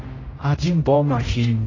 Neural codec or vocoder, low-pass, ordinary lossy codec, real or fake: codec, 16 kHz, 0.5 kbps, X-Codec, HuBERT features, trained on general audio; 7.2 kHz; AAC, 32 kbps; fake